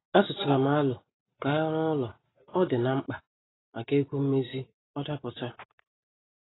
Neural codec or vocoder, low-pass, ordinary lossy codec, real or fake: vocoder, 44.1 kHz, 128 mel bands every 256 samples, BigVGAN v2; 7.2 kHz; AAC, 16 kbps; fake